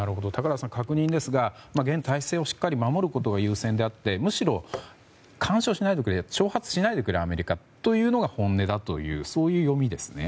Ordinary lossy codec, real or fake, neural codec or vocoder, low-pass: none; real; none; none